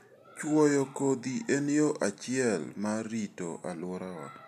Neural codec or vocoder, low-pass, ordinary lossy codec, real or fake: none; 14.4 kHz; none; real